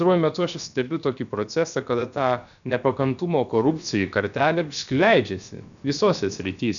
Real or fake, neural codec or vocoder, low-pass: fake; codec, 16 kHz, about 1 kbps, DyCAST, with the encoder's durations; 7.2 kHz